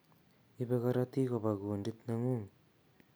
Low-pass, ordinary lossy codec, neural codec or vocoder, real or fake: none; none; none; real